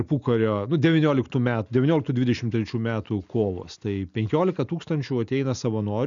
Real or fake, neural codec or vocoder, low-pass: real; none; 7.2 kHz